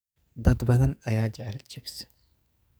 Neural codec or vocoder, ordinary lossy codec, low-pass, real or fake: codec, 44.1 kHz, 2.6 kbps, SNAC; none; none; fake